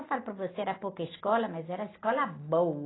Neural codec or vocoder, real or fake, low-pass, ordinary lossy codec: none; real; 7.2 kHz; AAC, 16 kbps